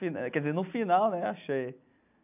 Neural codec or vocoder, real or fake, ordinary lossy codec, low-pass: none; real; none; 3.6 kHz